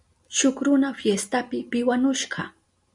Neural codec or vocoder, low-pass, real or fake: none; 10.8 kHz; real